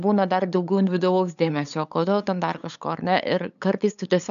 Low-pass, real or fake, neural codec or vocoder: 7.2 kHz; fake; codec, 16 kHz, 8 kbps, FunCodec, trained on LibriTTS, 25 frames a second